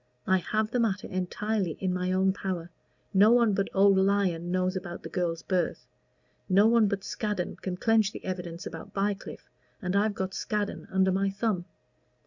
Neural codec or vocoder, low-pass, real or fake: none; 7.2 kHz; real